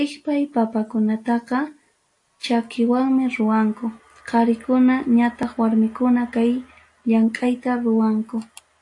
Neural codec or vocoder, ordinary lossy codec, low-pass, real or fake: none; AAC, 48 kbps; 10.8 kHz; real